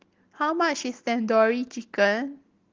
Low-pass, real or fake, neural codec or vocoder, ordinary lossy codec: 7.2 kHz; real; none; Opus, 16 kbps